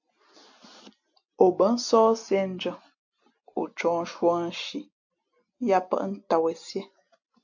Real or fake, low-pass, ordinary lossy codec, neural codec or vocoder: real; 7.2 kHz; AAC, 48 kbps; none